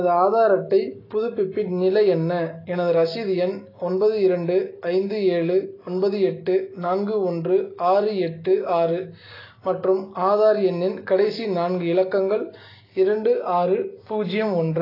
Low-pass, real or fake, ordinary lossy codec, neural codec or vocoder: 5.4 kHz; real; AAC, 24 kbps; none